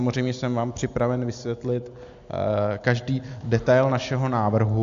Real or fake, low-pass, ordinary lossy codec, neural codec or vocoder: real; 7.2 kHz; AAC, 64 kbps; none